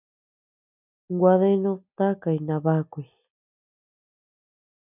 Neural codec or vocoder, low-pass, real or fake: none; 3.6 kHz; real